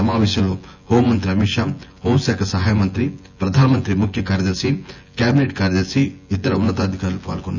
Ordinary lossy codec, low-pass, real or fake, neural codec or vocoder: none; 7.2 kHz; fake; vocoder, 24 kHz, 100 mel bands, Vocos